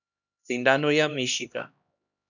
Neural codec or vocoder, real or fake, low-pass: codec, 16 kHz, 1 kbps, X-Codec, HuBERT features, trained on LibriSpeech; fake; 7.2 kHz